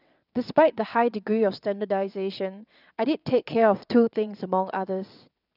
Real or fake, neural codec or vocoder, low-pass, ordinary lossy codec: fake; vocoder, 22.05 kHz, 80 mel bands, WaveNeXt; 5.4 kHz; none